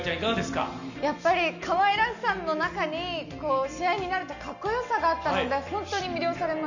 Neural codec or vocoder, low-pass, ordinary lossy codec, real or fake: none; 7.2 kHz; none; real